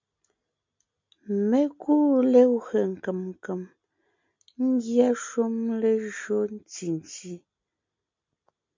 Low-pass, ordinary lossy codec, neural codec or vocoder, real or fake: 7.2 kHz; MP3, 64 kbps; none; real